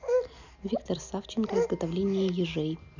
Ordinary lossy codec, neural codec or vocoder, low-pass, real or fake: none; none; 7.2 kHz; real